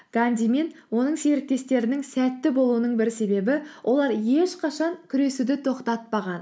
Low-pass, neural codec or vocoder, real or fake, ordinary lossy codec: none; none; real; none